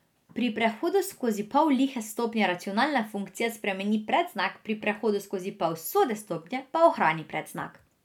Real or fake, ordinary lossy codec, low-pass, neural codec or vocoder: real; none; 19.8 kHz; none